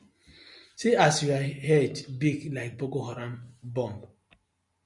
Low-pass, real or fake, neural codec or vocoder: 10.8 kHz; real; none